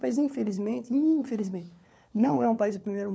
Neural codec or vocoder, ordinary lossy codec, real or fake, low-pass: codec, 16 kHz, 4 kbps, FunCodec, trained on LibriTTS, 50 frames a second; none; fake; none